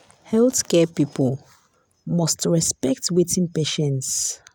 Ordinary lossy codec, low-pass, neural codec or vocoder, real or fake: none; none; none; real